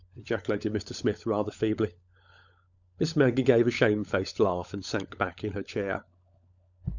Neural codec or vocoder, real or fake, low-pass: codec, 16 kHz, 16 kbps, FunCodec, trained on LibriTTS, 50 frames a second; fake; 7.2 kHz